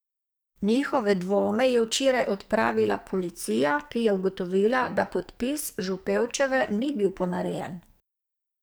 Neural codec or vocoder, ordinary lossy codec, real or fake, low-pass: codec, 44.1 kHz, 2.6 kbps, SNAC; none; fake; none